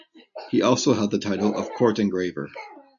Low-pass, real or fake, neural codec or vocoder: 7.2 kHz; real; none